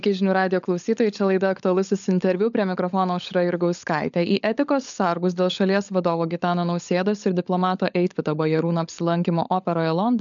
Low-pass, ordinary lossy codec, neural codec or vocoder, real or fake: 7.2 kHz; MP3, 96 kbps; codec, 16 kHz, 16 kbps, FunCodec, trained on LibriTTS, 50 frames a second; fake